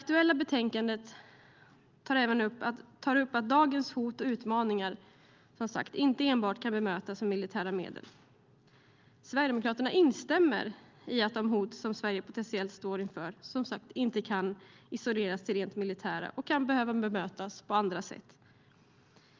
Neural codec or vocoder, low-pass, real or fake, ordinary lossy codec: none; 7.2 kHz; real; Opus, 32 kbps